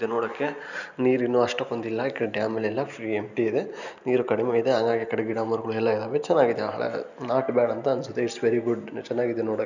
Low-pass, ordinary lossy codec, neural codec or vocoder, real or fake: 7.2 kHz; none; none; real